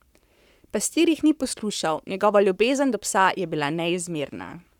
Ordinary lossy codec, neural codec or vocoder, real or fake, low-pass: none; codec, 44.1 kHz, 7.8 kbps, Pupu-Codec; fake; 19.8 kHz